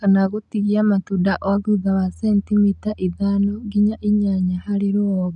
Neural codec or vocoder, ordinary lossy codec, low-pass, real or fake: none; none; 10.8 kHz; real